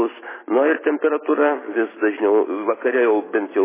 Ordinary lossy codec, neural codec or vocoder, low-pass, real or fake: MP3, 16 kbps; none; 3.6 kHz; real